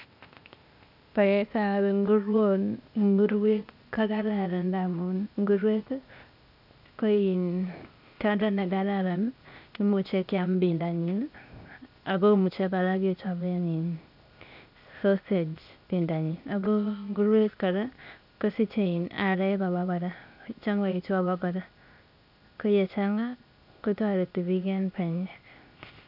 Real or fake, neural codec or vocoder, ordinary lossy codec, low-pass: fake; codec, 16 kHz, 0.8 kbps, ZipCodec; none; 5.4 kHz